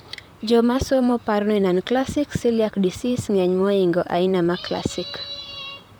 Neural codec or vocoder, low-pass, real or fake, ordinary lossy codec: vocoder, 44.1 kHz, 128 mel bands, Pupu-Vocoder; none; fake; none